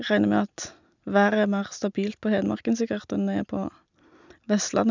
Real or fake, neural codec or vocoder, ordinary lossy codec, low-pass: real; none; none; 7.2 kHz